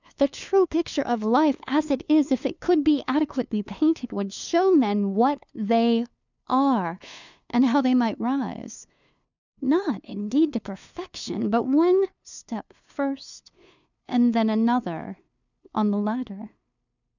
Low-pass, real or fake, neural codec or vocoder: 7.2 kHz; fake; codec, 16 kHz, 2 kbps, FunCodec, trained on LibriTTS, 25 frames a second